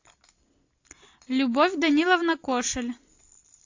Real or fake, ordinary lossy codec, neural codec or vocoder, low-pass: real; AAC, 48 kbps; none; 7.2 kHz